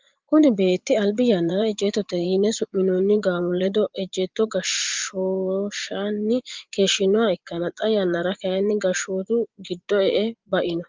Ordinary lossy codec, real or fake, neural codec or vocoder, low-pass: Opus, 32 kbps; fake; vocoder, 24 kHz, 100 mel bands, Vocos; 7.2 kHz